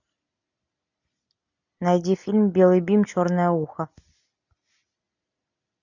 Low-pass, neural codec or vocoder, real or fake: 7.2 kHz; none; real